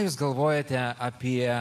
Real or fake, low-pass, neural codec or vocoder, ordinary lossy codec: real; 14.4 kHz; none; AAC, 64 kbps